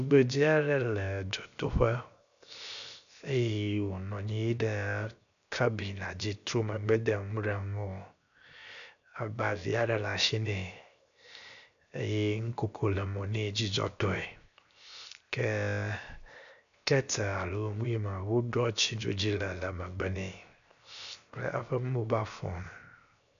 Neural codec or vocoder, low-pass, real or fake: codec, 16 kHz, 0.7 kbps, FocalCodec; 7.2 kHz; fake